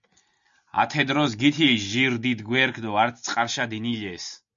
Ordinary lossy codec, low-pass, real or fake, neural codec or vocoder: MP3, 64 kbps; 7.2 kHz; real; none